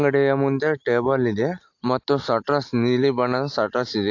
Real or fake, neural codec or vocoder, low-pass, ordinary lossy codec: real; none; 7.2 kHz; AAC, 48 kbps